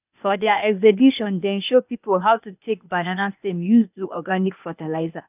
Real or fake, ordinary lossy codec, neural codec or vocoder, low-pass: fake; none; codec, 16 kHz, 0.8 kbps, ZipCodec; 3.6 kHz